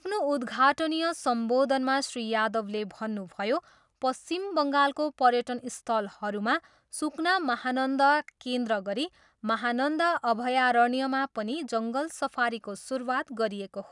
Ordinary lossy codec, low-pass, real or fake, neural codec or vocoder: none; 10.8 kHz; real; none